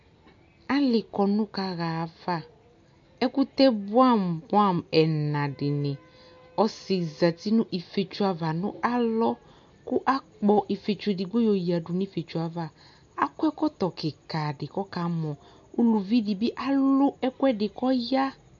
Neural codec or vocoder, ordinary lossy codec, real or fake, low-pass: none; MP3, 48 kbps; real; 7.2 kHz